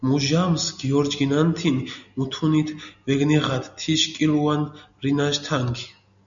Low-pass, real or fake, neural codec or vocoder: 7.2 kHz; real; none